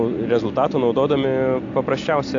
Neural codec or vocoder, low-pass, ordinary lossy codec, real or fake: none; 7.2 kHz; AAC, 48 kbps; real